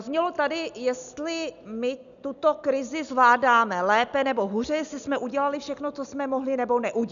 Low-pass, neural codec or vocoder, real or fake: 7.2 kHz; none; real